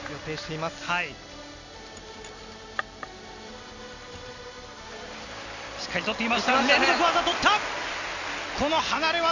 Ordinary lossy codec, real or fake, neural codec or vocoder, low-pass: none; real; none; 7.2 kHz